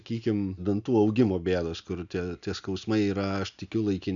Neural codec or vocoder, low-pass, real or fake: none; 7.2 kHz; real